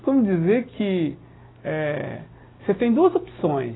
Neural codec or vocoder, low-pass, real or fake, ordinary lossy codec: none; 7.2 kHz; real; AAC, 16 kbps